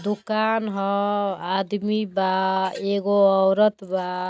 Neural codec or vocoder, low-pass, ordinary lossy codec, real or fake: none; none; none; real